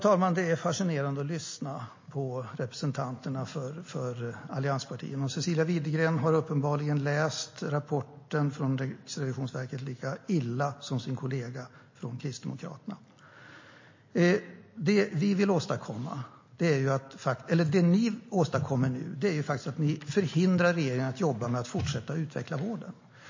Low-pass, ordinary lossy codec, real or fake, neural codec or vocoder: 7.2 kHz; MP3, 32 kbps; real; none